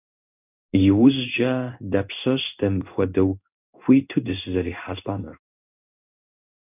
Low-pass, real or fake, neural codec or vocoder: 3.6 kHz; fake; codec, 16 kHz in and 24 kHz out, 1 kbps, XY-Tokenizer